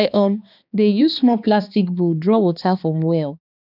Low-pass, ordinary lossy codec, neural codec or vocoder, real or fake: 5.4 kHz; none; codec, 16 kHz, 2 kbps, X-Codec, HuBERT features, trained on balanced general audio; fake